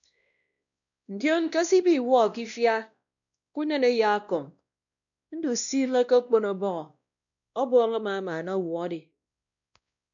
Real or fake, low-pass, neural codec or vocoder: fake; 7.2 kHz; codec, 16 kHz, 1 kbps, X-Codec, WavLM features, trained on Multilingual LibriSpeech